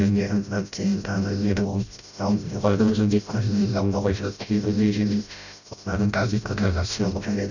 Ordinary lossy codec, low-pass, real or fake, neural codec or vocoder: none; 7.2 kHz; fake; codec, 16 kHz, 0.5 kbps, FreqCodec, smaller model